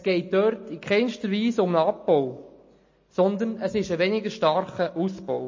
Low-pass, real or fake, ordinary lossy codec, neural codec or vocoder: 7.2 kHz; real; MP3, 32 kbps; none